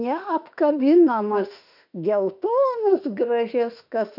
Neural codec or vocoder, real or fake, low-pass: autoencoder, 48 kHz, 32 numbers a frame, DAC-VAE, trained on Japanese speech; fake; 5.4 kHz